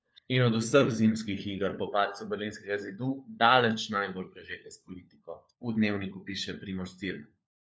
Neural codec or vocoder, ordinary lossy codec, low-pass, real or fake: codec, 16 kHz, 4 kbps, FunCodec, trained on LibriTTS, 50 frames a second; none; none; fake